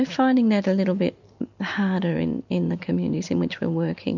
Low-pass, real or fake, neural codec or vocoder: 7.2 kHz; real; none